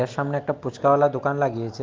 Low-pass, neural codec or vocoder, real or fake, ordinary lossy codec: 7.2 kHz; none; real; Opus, 32 kbps